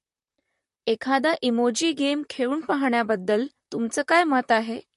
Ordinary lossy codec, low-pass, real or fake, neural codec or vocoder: MP3, 48 kbps; 14.4 kHz; fake; vocoder, 44.1 kHz, 128 mel bands, Pupu-Vocoder